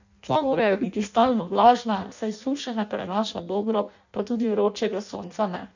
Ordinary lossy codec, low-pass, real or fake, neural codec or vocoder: none; 7.2 kHz; fake; codec, 16 kHz in and 24 kHz out, 0.6 kbps, FireRedTTS-2 codec